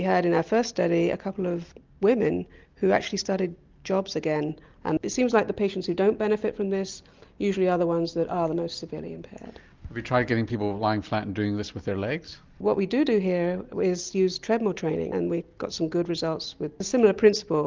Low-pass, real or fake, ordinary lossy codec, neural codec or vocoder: 7.2 kHz; real; Opus, 24 kbps; none